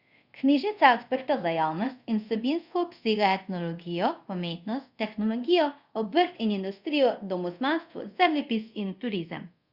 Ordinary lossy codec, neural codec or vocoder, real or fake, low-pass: Opus, 64 kbps; codec, 24 kHz, 0.5 kbps, DualCodec; fake; 5.4 kHz